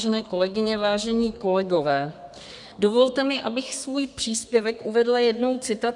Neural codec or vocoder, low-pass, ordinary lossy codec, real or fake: codec, 32 kHz, 1.9 kbps, SNAC; 10.8 kHz; MP3, 96 kbps; fake